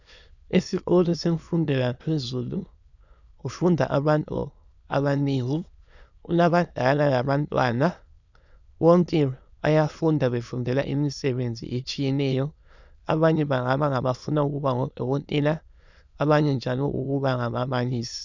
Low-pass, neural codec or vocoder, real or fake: 7.2 kHz; autoencoder, 22.05 kHz, a latent of 192 numbers a frame, VITS, trained on many speakers; fake